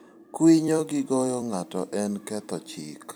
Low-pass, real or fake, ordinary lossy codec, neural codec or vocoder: none; fake; none; vocoder, 44.1 kHz, 128 mel bands every 256 samples, BigVGAN v2